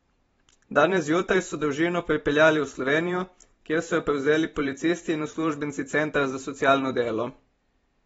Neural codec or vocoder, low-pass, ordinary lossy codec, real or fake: vocoder, 44.1 kHz, 128 mel bands every 512 samples, BigVGAN v2; 19.8 kHz; AAC, 24 kbps; fake